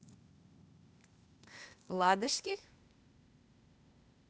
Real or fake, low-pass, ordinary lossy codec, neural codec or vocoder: fake; none; none; codec, 16 kHz, 0.8 kbps, ZipCodec